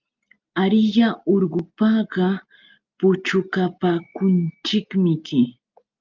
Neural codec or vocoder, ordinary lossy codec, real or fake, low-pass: none; Opus, 24 kbps; real; 7.2 kHz